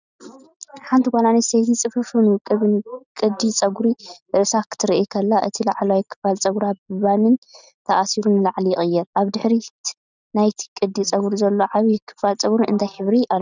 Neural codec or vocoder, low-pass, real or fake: none; 7.2 kHz; real